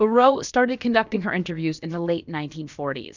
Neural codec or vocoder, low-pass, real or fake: codec, 16 kHz, about 1 kbps, DyCAST, with the encoder's durations; 7.2 kHz; fake